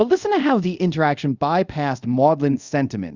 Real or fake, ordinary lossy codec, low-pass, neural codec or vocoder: fake; Opus, 64 kbps; 7.2 kHz; codec, 24 kHz, 0.5 kbps, DualCodec